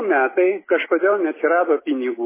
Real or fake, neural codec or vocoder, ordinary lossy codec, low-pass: real; none; MP3, 16 kbps; 3.6 kHz